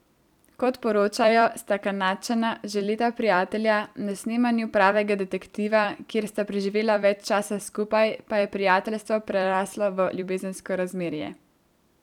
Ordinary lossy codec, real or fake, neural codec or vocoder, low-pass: none; fake; vocoder, 44.1 kHz, 128 mel bands every 256 samples, BigVGAN v2; 19.8 kHz